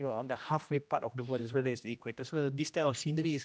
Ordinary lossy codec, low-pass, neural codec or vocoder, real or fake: none; none; codec, 16 kHz, 1 kbps, X-Codec, HuBERT features, trained on general audio; fake